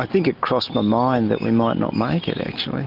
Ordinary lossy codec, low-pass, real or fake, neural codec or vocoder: Opus, 32 kbps; 5.4 kHz; real; none